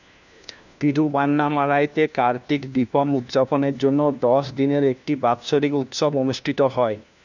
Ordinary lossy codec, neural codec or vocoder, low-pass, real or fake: none; codec, 16 kHz, 1 kbps, FunCodec, trained on LibriTTS, 50 frames a second; 7.2 kHz; fake